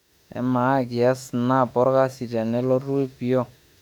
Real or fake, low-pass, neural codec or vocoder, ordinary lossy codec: fake; 19.8 kHz; autoencoder, 48 kHz, 32 numbers a frame, DAC-VAE, trained on Japanese speech; none